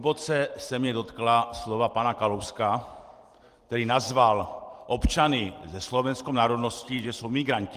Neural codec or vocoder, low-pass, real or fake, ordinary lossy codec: vocoder, 44.1 kHz, 128 mel bands every 256 samples, BigVGAN v2; 14.4 kHz; fake; Opus, 24 kbps